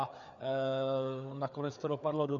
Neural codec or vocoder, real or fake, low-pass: codec, 16 kHz, 4 kbps, FreqCodec, larger model; fake; 7.2 kHz